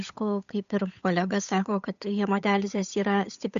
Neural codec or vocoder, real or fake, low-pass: codec, 16 kHz, 8 kbps, FunCodec, trained on Chinese and English, 25 frames a second; fake; 7.2 kHz